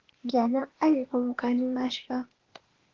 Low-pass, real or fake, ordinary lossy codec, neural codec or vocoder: 7.2 kHz; fake; Opus, 16 kbps; codec, 16 kHz, 0.8 kbps, ZipCodec